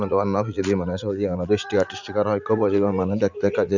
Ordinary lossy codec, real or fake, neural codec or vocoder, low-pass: none; fake; vocoder, 44.1 kHz, 80 mel bands, Vocos; 7.2 kHz